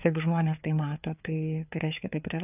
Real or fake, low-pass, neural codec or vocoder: fake; 3.6 kHz; codec, 16 kHz, 4 kbps, FreqCodec, larger model